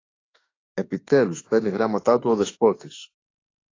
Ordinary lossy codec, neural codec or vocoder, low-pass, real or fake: AAC, 32 kbps; autoencoder, 48 kHz, 32 numbers a frame, DAC-VAE, trained on Japanese speech; 7.2 kHz; fake